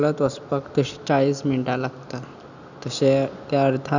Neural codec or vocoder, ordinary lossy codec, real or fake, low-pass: none; none; real; 7.2 kHz